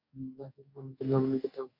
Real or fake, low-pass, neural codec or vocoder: fake; 5.4 kHz; codec, 44.1 kHz, 2.6 kbps, DAC